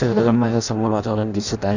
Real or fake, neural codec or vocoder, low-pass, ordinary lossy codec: fake; codec, 16 kHz in and 24 kHz out, 0.6 kbps, FireRedTTS-2 codec; 7.2 kHz; none